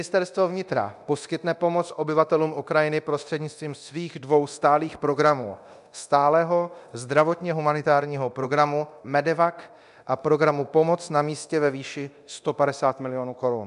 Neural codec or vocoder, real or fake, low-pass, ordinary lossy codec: codec, 24 kHz, 0.9 kbps, DualCodec; fake; 10.8 kHz; MP3, 96 kbps